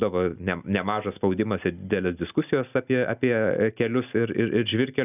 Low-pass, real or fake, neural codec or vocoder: 3.6 kHz; real; none